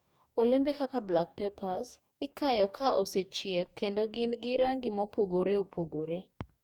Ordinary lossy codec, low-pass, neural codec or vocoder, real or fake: Opus, 64 kbps; 19.8 kHz; codec, 44.1 kHz, 2.6 kbps, DAC; fake